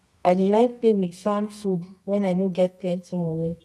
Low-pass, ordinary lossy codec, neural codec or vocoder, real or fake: none; none; codec, 24 kHz, 0.9 kbps, WavTokenizer, medium music audio release; fake